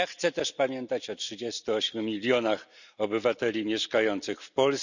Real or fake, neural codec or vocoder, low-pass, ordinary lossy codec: real; none; 7.2 kHz; none